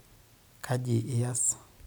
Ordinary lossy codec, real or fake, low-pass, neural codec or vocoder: none; real; none; none